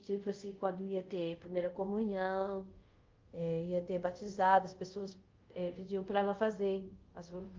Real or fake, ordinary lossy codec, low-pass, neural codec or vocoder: fake; Opus, 32 kbps; 7.2 kHz; codec, 24 kHz, 0.5 kbps, DualCodec